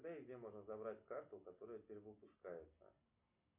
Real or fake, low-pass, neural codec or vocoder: real; 3.6 kHz; none